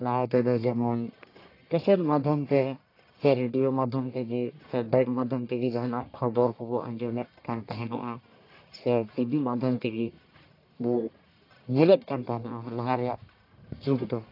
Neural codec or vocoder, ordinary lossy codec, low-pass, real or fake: codec, 44.1 kHz, 1.7 kbps, Pupu-Codec; AAC, 32 kbps; 5.4 kHz; fake